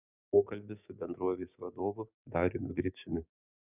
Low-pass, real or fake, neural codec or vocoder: 3.6 kHz; fake; codec, 16 kHz, 6 kbps, DAC